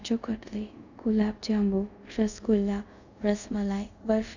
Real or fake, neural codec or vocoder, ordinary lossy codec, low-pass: fake; codec, 24 kHz, 0.5 kbps, DualCodec; none; 7.2 kHz